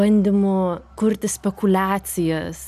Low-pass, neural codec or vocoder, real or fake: 14.4 kHz; none; real